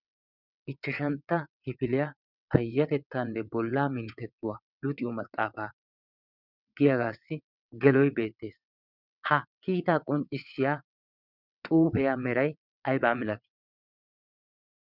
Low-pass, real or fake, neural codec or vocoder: 5.4 kHz; fake; vocoder, 22.05 kHz, 80 mel bands, WaveNeXt